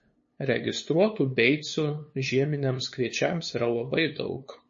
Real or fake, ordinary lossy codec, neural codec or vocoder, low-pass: fake; MP3, 32 kbps; codec, 16 kHz, 2 kbps, FunCodec, trained on LibriTTS, 25 frames a second; 7.2 kHz